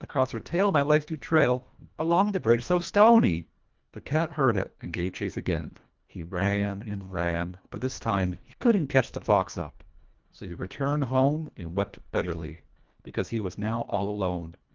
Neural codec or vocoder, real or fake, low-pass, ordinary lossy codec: codec, 24 kHz, 1.5 kbps, HILCodec; fake; 7.2 kHz; Opus, 32 kbps